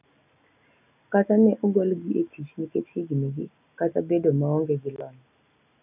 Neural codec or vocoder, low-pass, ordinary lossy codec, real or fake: none; 3.6 kHz; none; real